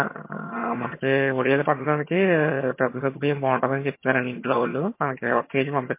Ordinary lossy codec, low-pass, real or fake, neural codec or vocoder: MP3, 24 kbps; 3.6 kHz; fake; vocoder, 22.05 kHz, 80 mel bands, HiFi-GAN